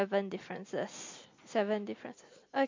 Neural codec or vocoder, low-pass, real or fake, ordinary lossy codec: none; 7.2 kHz; real; MP3, 48 kbps